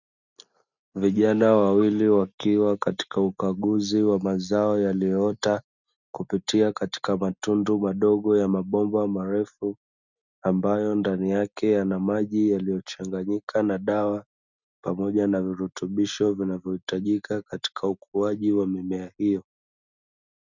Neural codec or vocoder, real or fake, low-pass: none; real; 7.2 kHz